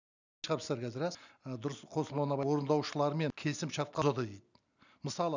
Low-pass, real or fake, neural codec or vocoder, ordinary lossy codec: 7.2 kHz; real; none; none